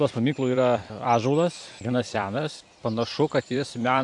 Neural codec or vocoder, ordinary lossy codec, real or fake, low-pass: vocoder, 44.1 kHz, 128 mel bands every 256 samples, BigVGAN v2; AAC, 64 kbps; fake; 10.8 kHz